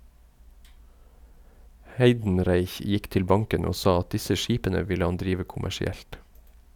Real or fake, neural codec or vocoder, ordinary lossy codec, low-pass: real; none; none; 19.8 kHz